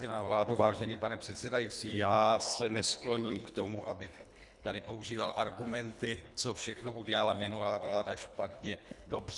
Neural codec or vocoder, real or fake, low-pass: codec, 24 kHz, 1.5 kbps, HILCodec; fake; 10.8 kHz